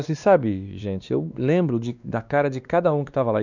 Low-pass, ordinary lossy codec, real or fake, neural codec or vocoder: 7.2 kHz; none; fake; codec, 16 kHz, 2 kbps, X-Codec, WavLM features, trained on Multilingual LibriSpeech